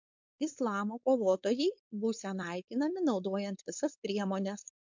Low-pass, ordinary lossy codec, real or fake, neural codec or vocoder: 7.2 kHz; MP3, 64 kbps; fake; codec, 16 kHz, 4.8 kbps, FACodec